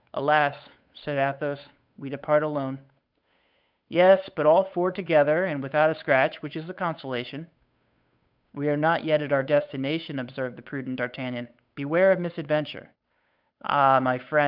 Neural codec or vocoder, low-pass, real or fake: codec, 16 kHz, 8 kbps, FunCodec, trained on Chinese and English, 25 frames a second; 5.4 kHz; fake